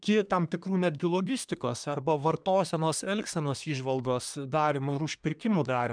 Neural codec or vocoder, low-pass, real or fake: codec, 24 kHz, 1 kbps, SNAC; 9.9 kHz; fake